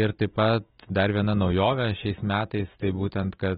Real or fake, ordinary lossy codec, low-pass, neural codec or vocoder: real; AAC, 16 kbps; 14.4 kHz; none